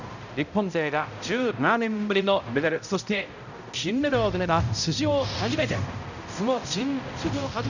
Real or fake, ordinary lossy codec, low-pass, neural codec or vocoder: fake; none; 7.2 kHz; codec, 16 kHz, 0.5 kbps, X-Codec, HuBERT features, trained on balanced general audio